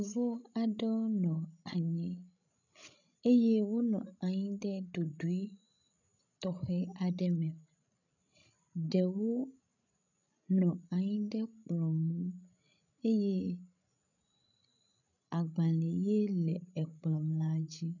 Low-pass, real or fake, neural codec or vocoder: 7.2 kHz; fake; codec, 16 kHz, 16 kbps, FreqCodec, larger model